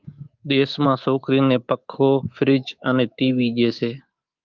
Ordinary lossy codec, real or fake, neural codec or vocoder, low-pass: Opus, 32 kbps; fake; codec, 24 kHz, 3.1 kbps, DualCodec; 7.2 kHz